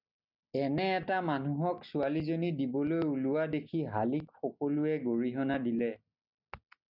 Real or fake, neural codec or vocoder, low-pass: real; none; 5.4 kHz